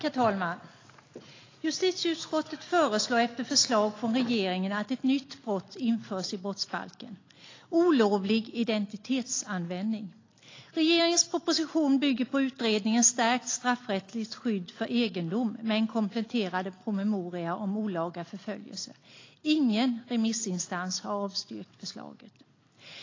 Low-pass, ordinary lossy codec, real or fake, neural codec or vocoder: 7.2 kHz; AAC, 32 kbps; real; none